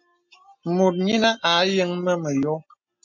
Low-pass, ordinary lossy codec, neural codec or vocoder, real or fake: 7.2 kHz; MP3, 64 kbps; none; real